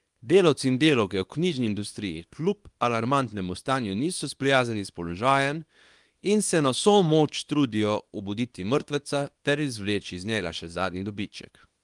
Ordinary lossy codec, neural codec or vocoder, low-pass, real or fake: Opus, 32 kbps; codec, 24 kHz, 0.9 kbps, WavTokenizer, small release; 10.8 kHz; fake